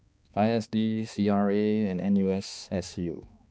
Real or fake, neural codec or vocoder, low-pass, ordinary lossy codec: fake; codec, 16 kHz, 2 kbps, X-Codec, HuBERT features, trained on balanced general audio; none; none